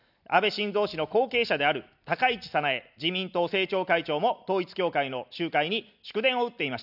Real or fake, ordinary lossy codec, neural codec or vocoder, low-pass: real; none; none; 5.4 kHz